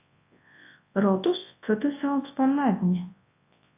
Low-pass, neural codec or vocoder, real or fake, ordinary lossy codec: 3.6 kHz; codec, 24 kHz, 0.9 kbps, WavTokenizer, large speech release; fake; AAC, 24 kbps